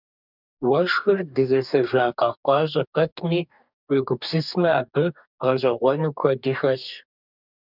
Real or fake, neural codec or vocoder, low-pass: fake; codec, 44.1 kHz, 2.6 kbps, DAC; 5.4 kHz